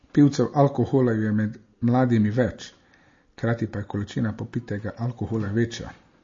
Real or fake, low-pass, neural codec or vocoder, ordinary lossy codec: real; 7.2 kHz; none; MP3, 32 kbps